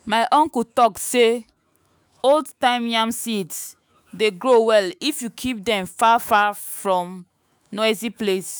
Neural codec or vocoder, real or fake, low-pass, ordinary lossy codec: autoencoder, 48 kHz, 128 numbers a frame, DAC-VAE, trained on Japanese speech; fake; none; none